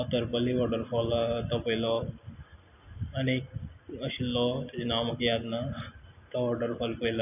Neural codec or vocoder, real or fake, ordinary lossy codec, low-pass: none; real; none; 3.6 kHz